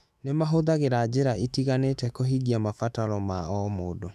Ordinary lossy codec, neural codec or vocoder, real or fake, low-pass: none; autoencoder, 48 kHz, 128 numbers a frame, DAC-VAE, trained on Japanese speech; fake; 14.4 kHz